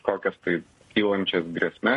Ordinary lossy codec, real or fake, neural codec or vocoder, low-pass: MP3, 48 kbps; fake; codec, 44.1 kHz, 7.8 kbps, Pupu-Codec; 14.4 kHz